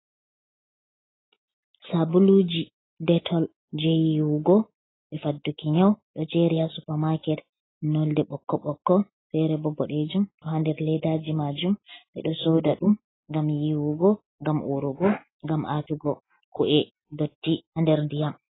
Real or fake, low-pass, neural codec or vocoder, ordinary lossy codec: real; 7.2 kHz; none; AAC, 16 kbps